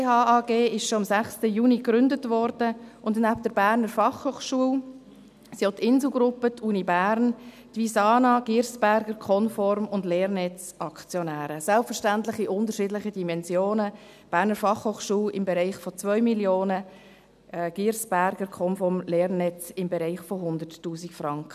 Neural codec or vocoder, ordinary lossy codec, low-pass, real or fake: none; none; 14.4 kHz; real